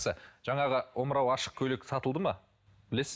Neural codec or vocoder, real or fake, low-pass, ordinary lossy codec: none; real; none; none